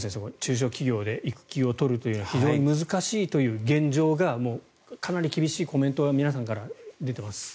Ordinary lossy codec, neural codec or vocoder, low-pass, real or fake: none; none; none; real